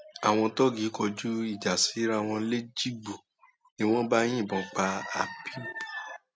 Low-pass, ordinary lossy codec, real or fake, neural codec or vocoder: none; none; real; none